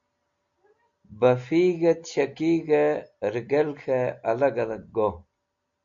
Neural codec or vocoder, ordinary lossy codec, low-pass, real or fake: none; MP3, 48 kbps; 7.2 kHz; real